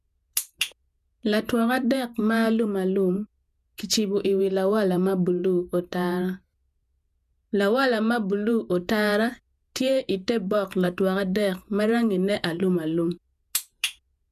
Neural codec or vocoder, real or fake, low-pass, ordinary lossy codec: vocoder, 48 kHz, 128 mel bands, Vocos; fake; 14.4 kHz; none